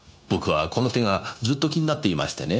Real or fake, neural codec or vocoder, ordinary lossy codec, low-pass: fake; codec, 16 kHz, 0.9 kbps, LongCat-Audio-Codec; none; none